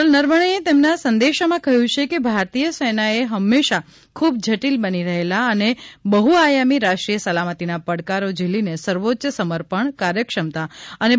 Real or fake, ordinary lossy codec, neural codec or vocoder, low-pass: real; none; none; none